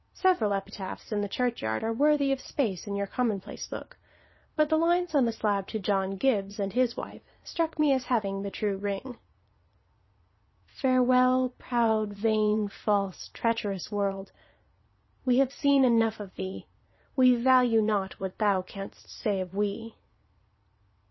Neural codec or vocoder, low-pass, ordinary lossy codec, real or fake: vocoder, 22.05 kHz, 80 mel bands, Vocos; 7.2 kHz; MP3, 24 kbps; fake